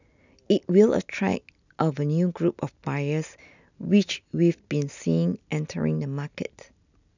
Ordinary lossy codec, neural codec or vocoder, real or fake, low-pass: none; none; real; 7.2 kHz